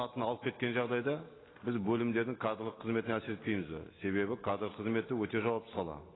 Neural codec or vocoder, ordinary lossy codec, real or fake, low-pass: none; AAC, 16 kbps; real; 7.2 kHz